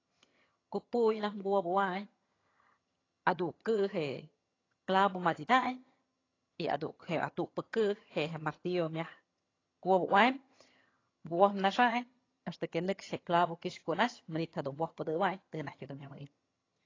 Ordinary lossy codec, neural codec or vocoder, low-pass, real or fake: AAC, 32 kbps; vocoder, 22.05 kHz, 80 mel bands, HiFi-GAN; 7.2 kHz; fake